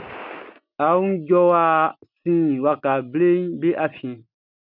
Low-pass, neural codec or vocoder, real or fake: 5.4 kHz; none; real